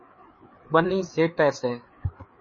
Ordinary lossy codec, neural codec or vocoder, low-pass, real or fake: MP3, 32 kbps; codec, 16 kHz, 4 kbps, FreqCodec, larger model; 7.2 kHz; fake